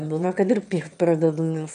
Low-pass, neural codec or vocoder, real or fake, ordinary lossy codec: 9.9 kHz; autoencoder, 22.05 kHz, a latent of 192 numbers a frame, VITS, trained on one speaker; fake; AAC, 96 kbps